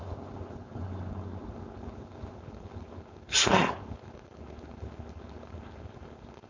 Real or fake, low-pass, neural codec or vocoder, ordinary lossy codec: fake; 7.2 kHz; codec, 16 kHz, 4.8 kbps, FACodec; MP3, 64 kbps